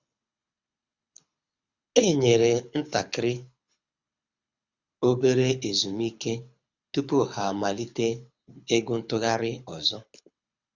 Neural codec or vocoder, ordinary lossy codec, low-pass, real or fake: codec, 24 kHz, 6 kbps, HILCodec; Opus, 64 kbps; 7.2 kHz; fake